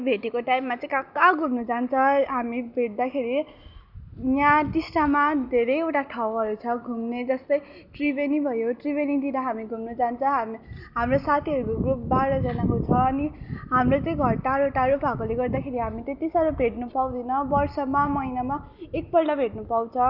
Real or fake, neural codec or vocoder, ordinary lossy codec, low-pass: real; none; none; 5.4 kHz